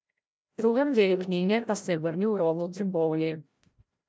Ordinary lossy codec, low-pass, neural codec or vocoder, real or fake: none; none; codec, 16 kHz, 0.5 kbps, FreqCodec, larger model; fake